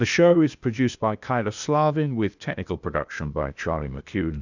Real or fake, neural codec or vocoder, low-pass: fake; codec, 16 kHz, 0.8 kbps, ZipCodec; 7.2 kHz